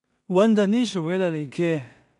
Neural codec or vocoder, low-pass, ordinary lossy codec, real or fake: codec, 16 kHz in and 24 kHz out, 0.4 kbps, LongCat-Audio-Codec, two codebook decoder; 10.8 kHz; none; fake